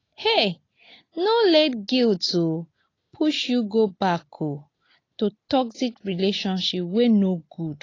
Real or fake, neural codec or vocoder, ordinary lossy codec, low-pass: real; none; AAC, 32 kbps; 7.2 kHz